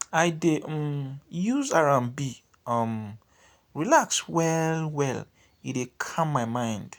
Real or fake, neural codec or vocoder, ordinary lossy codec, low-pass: real; none; none; none